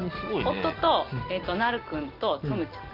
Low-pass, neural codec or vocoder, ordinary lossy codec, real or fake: 5.4 kHz; none; Opus, 32 kbps; real